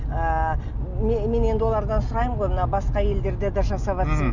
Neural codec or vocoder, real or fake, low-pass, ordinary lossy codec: none; real; 7.2 kHz; none